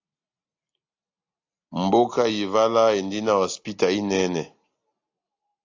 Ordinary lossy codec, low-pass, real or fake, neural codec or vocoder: AAC, 48 kbps; 7.2 kHz; real; none